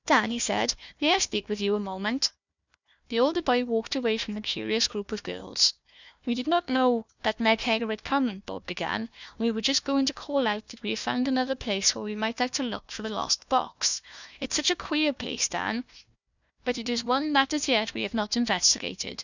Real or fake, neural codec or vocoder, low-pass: fake; codec, 16 kHz, 1 kbps, FunCodec, trained on Chinese and English, 50 frames a second; 7.2 kHz